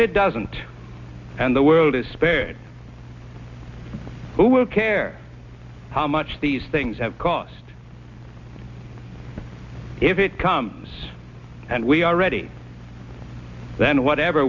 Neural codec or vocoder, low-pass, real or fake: none; 7.2 kHz; real